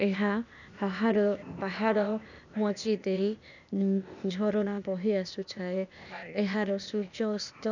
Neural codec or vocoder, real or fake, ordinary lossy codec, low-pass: codec, 16 kHz, 0.8 kbps, ZipCodec; fake; none; 7.2 kHz